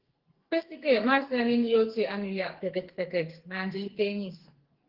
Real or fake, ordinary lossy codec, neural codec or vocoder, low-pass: fake; Opus, 16 kbps; codec, 44.1 kHz, 2.6 kbps, SNAC; 5.4 kHz